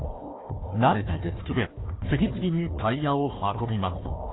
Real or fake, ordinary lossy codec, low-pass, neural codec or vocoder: fake; AAC, 16 kbps; 7.2 kHz; codec, 16 kHz, 1 kbps, FunCodec, trained on Chinese and English, 50 frames a second